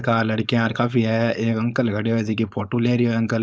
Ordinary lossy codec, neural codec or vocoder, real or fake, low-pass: none; codec, 16 kHz, 4.8 kbps, FACodec; fake; none